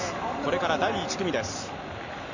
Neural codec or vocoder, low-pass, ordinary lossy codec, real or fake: none; 7.2 kHz; none; real